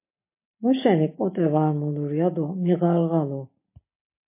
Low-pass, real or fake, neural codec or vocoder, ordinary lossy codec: 3.6 kHz; real; none; MP3, 24 kbps